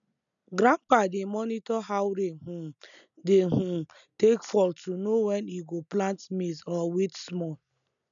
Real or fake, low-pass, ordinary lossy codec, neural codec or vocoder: real; 7.2 kHz; none; none